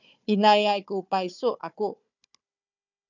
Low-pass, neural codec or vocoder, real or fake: 7.2 kHz; codec, 16 kHz, 4 kbps, FunCodec, trained on Chinese and English, 50 frames a second; fake